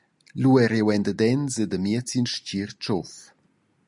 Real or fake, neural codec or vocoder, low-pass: real; none; 10.8 kHz